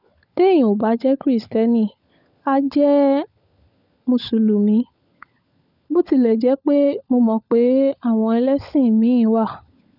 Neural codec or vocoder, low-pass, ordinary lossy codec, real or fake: codec, 16 kHz, 16 kbps, FunCodec, trained on LibriTTS, 50 frames a second; 5.4 kHz; none; fake